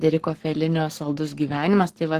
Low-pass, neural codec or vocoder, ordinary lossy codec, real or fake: 14.4 kHz; codec, 44.1 kHz, 7.8 kbps, Pupu-Codec; Opus, 16 kbps; fake